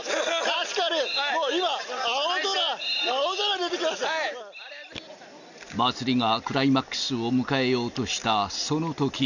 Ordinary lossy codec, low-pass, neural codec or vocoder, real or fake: none; 7.2 kHz; none; real